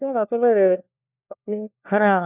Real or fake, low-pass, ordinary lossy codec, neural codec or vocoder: fake; 3.6 kHz; none; codec, 16 kHz, 1 kbps, FunCodec, trained on LibriTTS, 50 frames a second